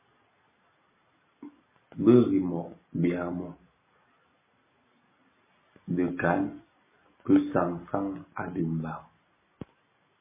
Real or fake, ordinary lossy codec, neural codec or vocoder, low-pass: real; MP3, 16 kbps; none; 3.6 kHz